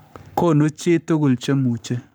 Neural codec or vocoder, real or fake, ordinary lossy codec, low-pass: codec, 44.1 kHz, 7.8 kbps, Pupu-Codec; fake; none; none